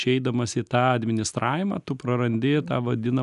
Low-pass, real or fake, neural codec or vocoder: 10.8 kHz; real; none